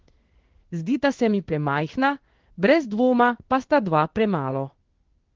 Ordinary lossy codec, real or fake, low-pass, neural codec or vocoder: Opus, 16 kbps; fake; 7.2 kHz; codec, 16 kHz in and 24 kHz out, 1 kbps, XY-Tokenizer